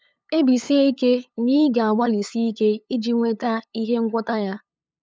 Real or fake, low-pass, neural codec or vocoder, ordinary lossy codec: fake; none; codec, 16 kHz, 8 kbps, FunCodec, trained on LibriTTS, 25 frames a second; none